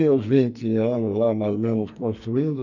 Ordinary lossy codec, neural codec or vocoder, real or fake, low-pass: none; codec, 44.1 kHz, 2.6 kbps, SNAC; fake; 7.2 kHz